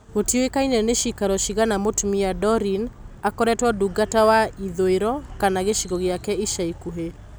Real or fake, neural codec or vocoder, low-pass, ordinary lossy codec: real; none; none; none